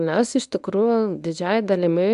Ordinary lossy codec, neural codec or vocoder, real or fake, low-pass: MP3, 96 kbps; codec, 24 kHz, 0.9 kbps, WavTokenizer, medium speech release version 1; fake; 10.8 kHz